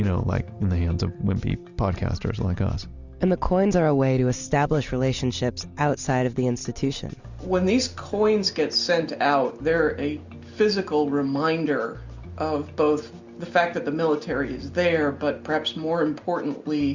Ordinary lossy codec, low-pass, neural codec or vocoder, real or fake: Opus, 64 kbps; 7.2 kHz; none; real